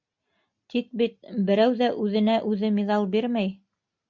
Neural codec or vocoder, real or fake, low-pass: none; real; 7.2 kHz